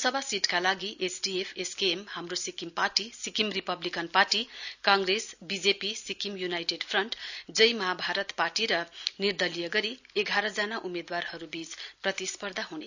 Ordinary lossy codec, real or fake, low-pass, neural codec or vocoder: none; real; 7.2 kHz; none